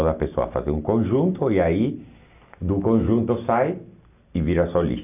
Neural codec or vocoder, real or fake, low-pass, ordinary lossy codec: none; real; 3.6 kHz; none